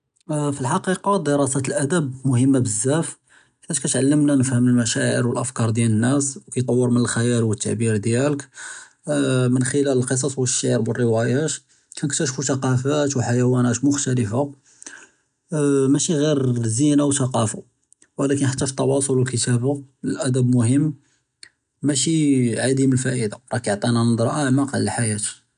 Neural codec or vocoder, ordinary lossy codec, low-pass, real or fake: none; none; 9.9 kHz; real